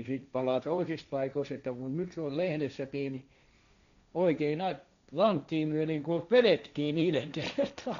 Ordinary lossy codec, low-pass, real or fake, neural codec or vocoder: none; 7.2 kHz; fake; codec, 16 kHz, 1.1 kbps, Voila-Tokenizer